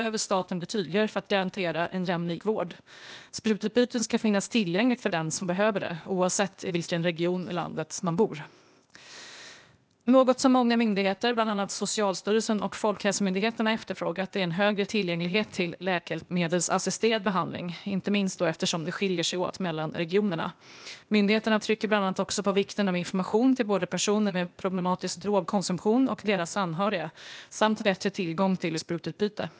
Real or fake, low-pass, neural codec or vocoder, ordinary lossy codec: fake; none; codec, 16 kHz, 0.8 kbps, ZipCodec; none